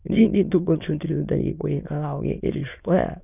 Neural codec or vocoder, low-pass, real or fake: autoencoder, 22.05 kHz, a latent of 192 numbers a frame, VITS, trained on many speakers; 3.6 kHz; fake